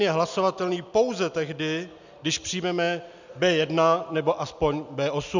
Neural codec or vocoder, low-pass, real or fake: none; 7.2 kHz; real